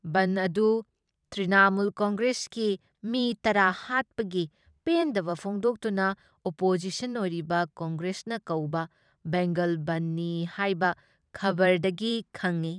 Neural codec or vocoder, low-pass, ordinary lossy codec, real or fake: vocoder, 44.1 kHz, 128 mel bands every 512 samples, BigVGAN v2; 9.9 kHz; none; fake